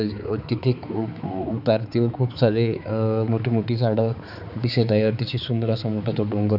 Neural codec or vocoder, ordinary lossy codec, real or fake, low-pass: codec, 16 kHz, 4 kbps, X-Codec, HuBERT features, trained on balanced general audio; none; fake; 5.4 kHz